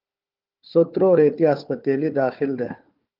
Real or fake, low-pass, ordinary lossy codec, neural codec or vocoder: fake; 5.4 kHz; Opus, 24 kbps; codec, 16 kHz, 4 kbps, FunCodec, trained on Chinese and English, 50 frames a second